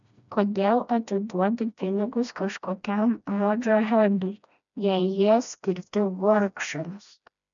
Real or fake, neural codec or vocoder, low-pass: fake; codec, 16 kHz, 1 kbps, FreqCodec, smaller model; 7.2 kHz